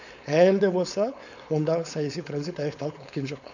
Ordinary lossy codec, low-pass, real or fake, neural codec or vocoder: none; 7.2 kHz; fake; codec, 16 kHz, 4.8 kbps, FACodec